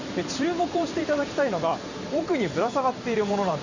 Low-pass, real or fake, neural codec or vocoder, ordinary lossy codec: 7.2 kHz; fake; vocoder, 44.1 kHz, 128 mel bands every 512 samples, BigVGAN v2; Opus, 64 kbps